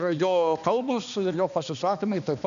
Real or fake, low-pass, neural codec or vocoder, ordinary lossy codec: fake; 7.2 kHz; codec, 16 kHz, 2 kbps, X-Codec, HuBERT features, trained on balanced general audio; MP3, 96 kbps